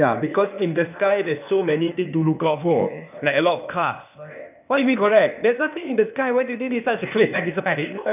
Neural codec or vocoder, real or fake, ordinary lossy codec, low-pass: codec, 16 kHz, 0.8 kbps, ZipCodec; fake; none; 3.6 kHz